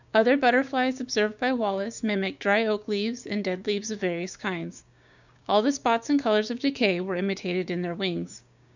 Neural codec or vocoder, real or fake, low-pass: codec, 16 kHz, 6 kbps, DAC; fake; 7.2 kHz